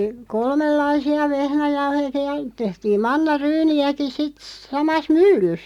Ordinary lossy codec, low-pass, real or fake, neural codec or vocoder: none; 19.8 kHz; real; none